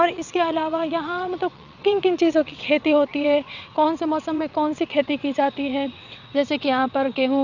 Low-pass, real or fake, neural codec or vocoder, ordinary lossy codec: 7.2 kHz; fake; vocoder, 22.05 kHz, 80 mel bands, WaveNeXt; none